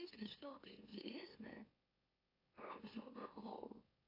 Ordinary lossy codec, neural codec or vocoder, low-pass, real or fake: AAC, 32 kbps; autoencoder, 44.1 kHz, a latent of 192 numbers a frame, MeloTTS; 5.4 kHz; fake